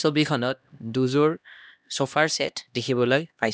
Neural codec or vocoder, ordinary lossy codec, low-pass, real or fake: codec, 16 kHz, 1 kbps, X-Codec, HuBERT features, trained on LibriSpeech; none; none; fake